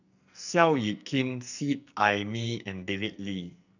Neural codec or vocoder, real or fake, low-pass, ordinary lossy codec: codec, 44.1 kHz, 2.6 kbps, SNAC; fake; 7.2 kHz; none